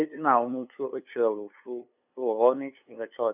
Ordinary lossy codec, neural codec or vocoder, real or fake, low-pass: none; codec, 16 kHz, 2 kbps, FunCodec, trained on LibriTTS, 25 frames a second; fake; 3.6 kHz